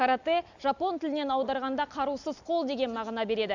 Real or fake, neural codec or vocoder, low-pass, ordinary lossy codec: real; none; 7.2 kHz; none